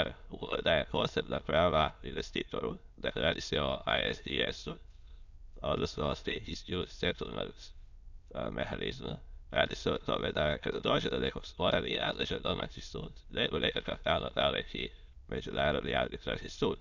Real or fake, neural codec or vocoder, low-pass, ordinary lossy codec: fake; autoencoder, 22.05 kHz, a latent of 192 numbers a frame, VITS, trained on many speakers; 7.2 kHz; none